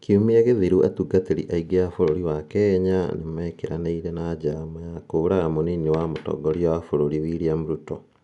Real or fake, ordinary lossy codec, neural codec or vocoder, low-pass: real; none; none; 10.8 kHz